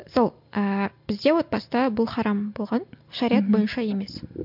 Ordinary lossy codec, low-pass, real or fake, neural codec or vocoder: MP3, 48 kbps; 5.4 kHz; real; none